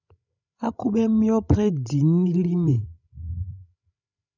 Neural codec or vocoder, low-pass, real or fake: codec, 16 kHz, 16 kbps, FreqCodec, larger model; 7.2 kHz; fake